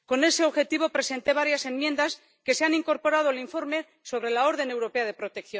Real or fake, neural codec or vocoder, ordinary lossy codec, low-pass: real; none; none; none